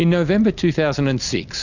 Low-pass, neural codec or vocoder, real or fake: 7.2 kHz; none; real